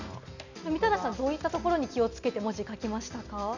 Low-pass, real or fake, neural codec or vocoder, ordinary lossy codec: 7.2 kHz; real; none; none